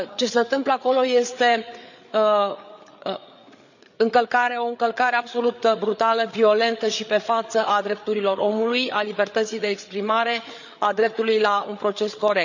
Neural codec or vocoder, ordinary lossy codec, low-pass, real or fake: codec, 16 kHz, 8 kbps, FreqCodec, larger model; none; 7.2 kHz; fake